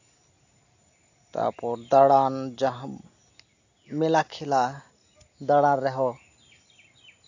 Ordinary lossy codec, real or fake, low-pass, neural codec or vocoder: AAC, 48 kbps; real; 7.2 kHz; none